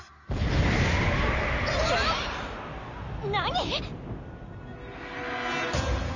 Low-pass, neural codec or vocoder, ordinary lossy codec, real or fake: 7.2 kHz; none; none; real